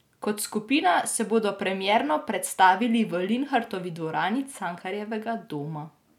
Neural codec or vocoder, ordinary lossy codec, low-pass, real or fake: none; none; 19.8 kHz; real